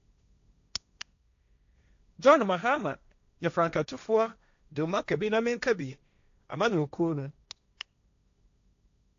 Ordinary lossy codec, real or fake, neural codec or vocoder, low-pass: AAC, 64 kbps; fake; codec, 16 kHz, 1.1 kbps, Voila-Tokenizer; 7.2 kHz